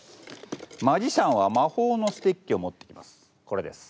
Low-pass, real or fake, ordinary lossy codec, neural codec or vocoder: none; real; none; none